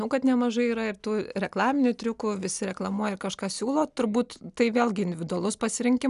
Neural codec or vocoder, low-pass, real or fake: none; 10.8 kHz; real